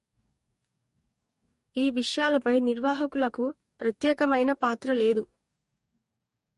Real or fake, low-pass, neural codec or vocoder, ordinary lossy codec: fake; 14.4 kHz; codec, 44.1 kHz, 2.6 kbps, DAC; MP3, 48 kbps